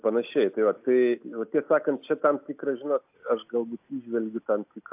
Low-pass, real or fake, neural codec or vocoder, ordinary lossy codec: 3.6 kHz; real; none; AAC, 32 kbps